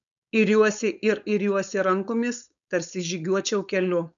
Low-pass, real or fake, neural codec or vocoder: 7.2 kHz; fake; codec, 16 kHz, 4.8 kbps, FACodec